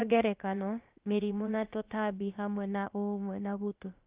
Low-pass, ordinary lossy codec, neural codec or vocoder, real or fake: 3.6 kHz; Opus, 24 kbps; codec, 16 kHz, about 1 kbps, DyCAST, with the encoder's durations; fake